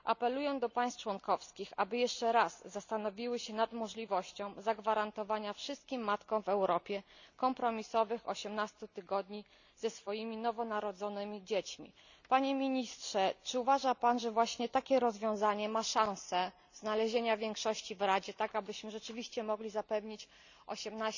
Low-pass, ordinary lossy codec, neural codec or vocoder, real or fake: 7.2 kHz; none; none; real